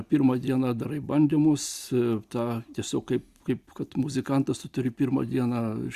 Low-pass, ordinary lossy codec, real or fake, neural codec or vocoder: 14.4 kHz; Opus, 64 kbps; real; none